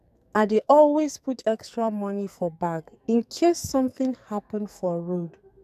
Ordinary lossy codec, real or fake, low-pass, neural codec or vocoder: none; fake; 14.4 kHz; codec, 44.1 kHz, 2.6 kbps, SNAC